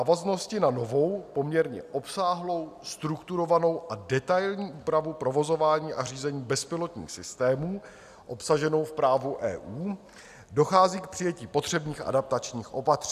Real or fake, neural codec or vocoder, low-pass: real; none; 14.4 kHz